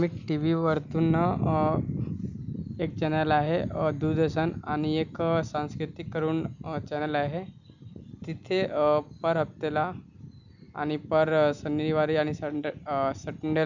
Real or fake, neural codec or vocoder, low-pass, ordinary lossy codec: real; none; 7.2 kHz; none